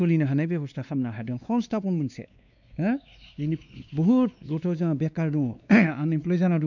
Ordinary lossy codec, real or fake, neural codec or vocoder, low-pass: none; fake; codec, 16 kHz, 2 kbps, X-Codec, WavLM features, trained on Multilingual LibriSpeech; 7.2 kHz